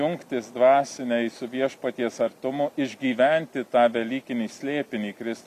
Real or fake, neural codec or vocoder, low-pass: real; none; 14.4 kHz